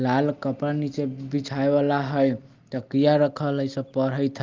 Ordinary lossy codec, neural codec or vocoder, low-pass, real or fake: Opus, 32 kbps; none; 7.2 kHz; real